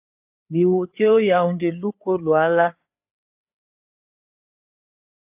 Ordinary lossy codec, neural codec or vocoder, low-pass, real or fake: AAC, 32 kbps; codec, 16 kHz, 4 kbps, FreqCodec, larger model; 3.6 kHz; fake